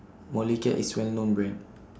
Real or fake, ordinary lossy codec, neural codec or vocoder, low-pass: real; none; none; none